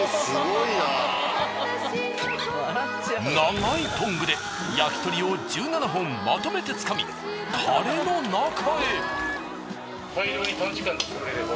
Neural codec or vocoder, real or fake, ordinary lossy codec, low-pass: none; real; none; none